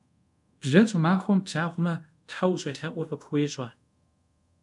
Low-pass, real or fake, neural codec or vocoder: 10.8 kHz; fake; codec, 24 kHz, 0.5 kbps, DualCodec